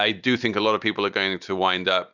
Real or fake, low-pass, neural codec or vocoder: real; 7.2 kHz; none